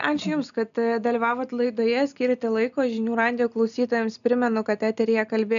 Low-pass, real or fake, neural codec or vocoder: 7.2 kHz; real; none